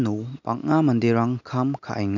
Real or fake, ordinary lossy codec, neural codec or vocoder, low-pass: real; none; none; 7.2 kHz